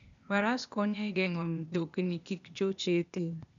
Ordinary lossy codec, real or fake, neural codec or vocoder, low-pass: none; fake; codec, 16 kHz, 0.8 kbps, ZipCodec; 7.2 kHz